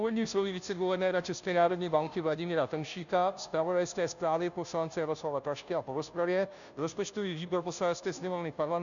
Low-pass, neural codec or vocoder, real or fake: 7.2 kHz; codec, 16 kHz, 0.5 kbps, FunCodec, trained on Chinese and English, 25 frames a second; fake